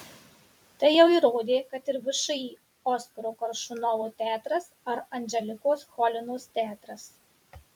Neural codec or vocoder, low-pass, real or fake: vocoder, 44.1 kHz, 128 mel bands every 256 samples, BigVGAN v2; 19.8 kHz; fake